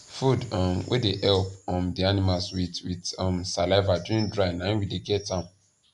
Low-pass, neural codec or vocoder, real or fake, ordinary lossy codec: 10.8 kHz; none; real; none